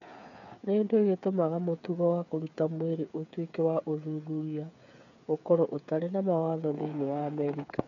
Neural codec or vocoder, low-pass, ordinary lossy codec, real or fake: codec, 16 kHz, 8 kbps, FreqCodec, smaller model; 7.2 kHz; none; fake